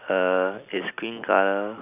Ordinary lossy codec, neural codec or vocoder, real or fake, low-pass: none; none; real; 3.6 kHz